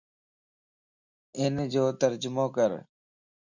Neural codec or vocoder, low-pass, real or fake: none; 7.2 kHz; real